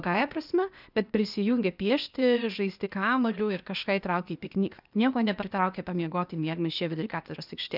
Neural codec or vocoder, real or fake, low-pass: codec, 16 kHz, 0.8 kbps, ZipCodec; fake; 5.4 kHz